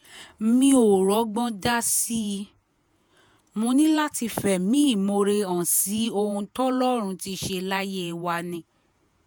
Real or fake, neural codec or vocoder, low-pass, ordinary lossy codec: fake; vocoder, 48 kHz, 128 mel bands, Vocos; none; none